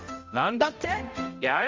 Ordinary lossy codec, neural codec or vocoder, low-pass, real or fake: Opus, 32 kbps; codec, 16 kHz, 0.5 kbps, X-Codec, HuBERT features, trained on balanced general audio; 7.2 kHz; fake